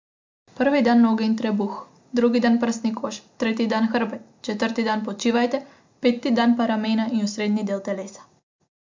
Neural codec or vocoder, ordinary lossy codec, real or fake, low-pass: none; MP3, 64 kbps; real; 7.2 kHz